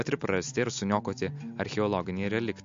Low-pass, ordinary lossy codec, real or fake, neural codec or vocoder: 7.2 kHz; MP3, 48 kbps; real; none